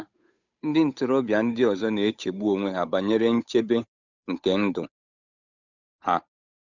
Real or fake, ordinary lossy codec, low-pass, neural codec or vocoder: fake; none; 7.2 kHz; codec, 16 kHz, 8 kbps, FunCodec, trained on Chinese and English, 25 frames a second